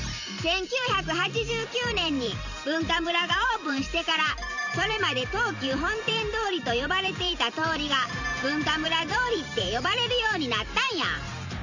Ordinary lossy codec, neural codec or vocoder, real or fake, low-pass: none; vocoder, 44.1 kHz, 128 mel bands every 512 samples, BigVGAN v2; fake; 7.2 kHz